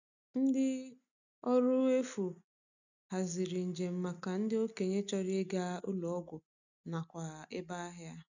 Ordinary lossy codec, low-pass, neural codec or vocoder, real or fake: none; 7.2 kHz; none; real